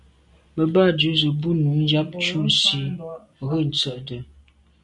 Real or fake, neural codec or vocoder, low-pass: real; none; 10.8 kHz